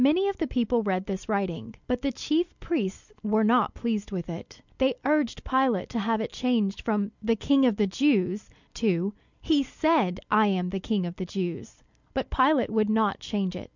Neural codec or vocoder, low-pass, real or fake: none; 7.2 kHz; real